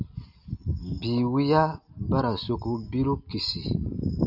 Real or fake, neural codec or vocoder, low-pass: real; none; 5.4 kHz